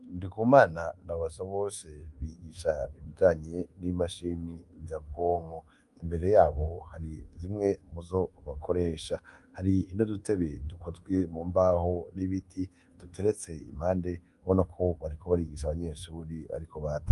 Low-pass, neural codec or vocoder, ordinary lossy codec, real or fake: 10.8 kHz; codec, 24 kHz, 1.2 kbps, DualCodec; Opus, 32 kbps; fake